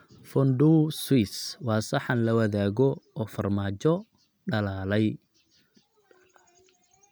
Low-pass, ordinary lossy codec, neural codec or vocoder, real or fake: none; none; none; real